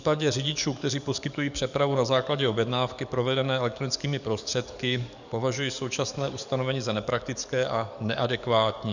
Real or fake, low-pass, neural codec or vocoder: fake; 7.2 kHz; codec, 44.1 kHz, 7.8 kbps, DAC